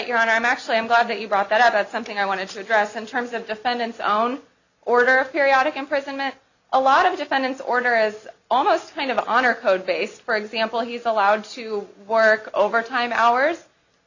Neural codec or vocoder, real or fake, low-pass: none; real; 7.2 kHz